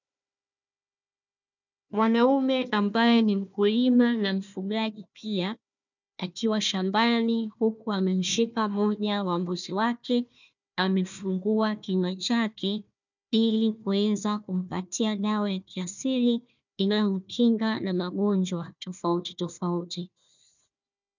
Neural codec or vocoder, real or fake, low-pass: codec, 16 kHz, 1 kbps, FunCodec, trained on Chinese and English, 50 frames a second; fake; 7.2 kHz